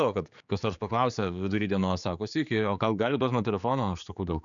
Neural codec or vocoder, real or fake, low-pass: codec, 16 kHz, 4 kbps, X-Codec, HuBERT features, trained on general audio; fake; 7.2 kHz